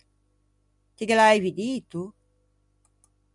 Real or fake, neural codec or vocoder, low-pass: real; none; 10.8 kHz